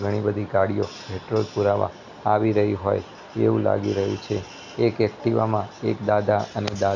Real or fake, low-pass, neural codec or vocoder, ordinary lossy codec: real; 7.2 kHz; none; none